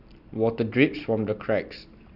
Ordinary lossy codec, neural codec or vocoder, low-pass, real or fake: none; none; 5.4 kHz; real